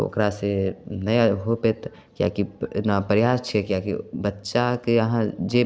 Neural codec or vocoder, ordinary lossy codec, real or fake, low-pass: none; none; real; none